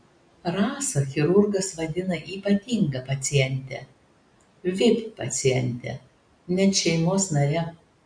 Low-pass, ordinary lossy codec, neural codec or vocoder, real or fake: 9.9 kHz; MP3, 48 kbps; none; real